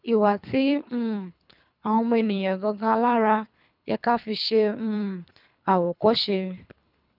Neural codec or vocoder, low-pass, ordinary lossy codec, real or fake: codec, 24 kHz, 3 kbps, HILCodec; 5.4 kHz; none; fake